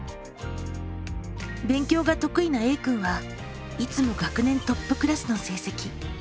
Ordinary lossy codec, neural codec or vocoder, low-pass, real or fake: none; none; none; real